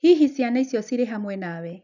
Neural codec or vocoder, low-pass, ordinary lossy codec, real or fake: none; 7.2 kHz; none; real